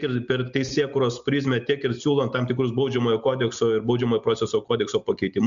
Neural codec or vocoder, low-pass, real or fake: none; 7.2 kHz; real